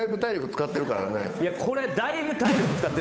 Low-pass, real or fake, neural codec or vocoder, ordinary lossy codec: none; fake; codec, 16 kHz, 8 kbps, FunCodec, trained on Chinese and English, 25 frames a second; none